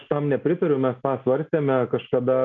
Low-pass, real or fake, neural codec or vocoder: 7.2 kHz; real; none